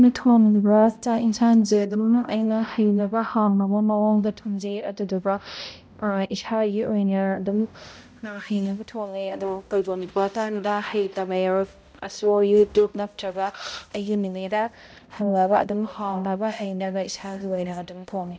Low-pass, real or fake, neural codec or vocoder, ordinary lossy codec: none; fake; codec, 16 kHz, 0.5 kbps, X-Codec, HuBERT features, trained on balanced general audio; none